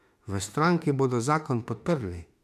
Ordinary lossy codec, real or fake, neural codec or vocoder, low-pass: AAC, 96 kbps; fake; autoencoder, 48 kHz, 32 numbers a frame, DAC-VAE, trained on Japanese speech; 14.4 kHz